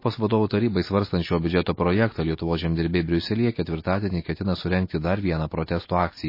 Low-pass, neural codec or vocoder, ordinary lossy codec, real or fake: 5.4 kHz; none; MP3, 24 kbps; real